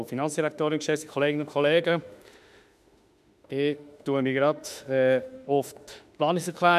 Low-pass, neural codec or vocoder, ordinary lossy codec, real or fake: 14.4 kHz; autoencoder, 48 kHz, 32 numbers a frame, DAC-VAE, trained on Japanese speech; none; fake